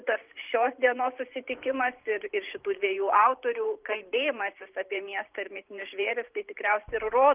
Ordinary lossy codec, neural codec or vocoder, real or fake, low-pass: Opus, 24 kbps; vocoder, 44.1 kHz, 128 mel bands every 512 samples, BigVGAN v2; fake; 3.6 kHz